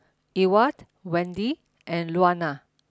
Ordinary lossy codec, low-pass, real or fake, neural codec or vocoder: none; none; real; none